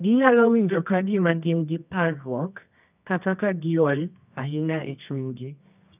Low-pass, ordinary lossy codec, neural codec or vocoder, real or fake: 3.6 kHz; none; codec, 24 kHz, 0.9 kbps, WavTokenizer, medium music audio release; fake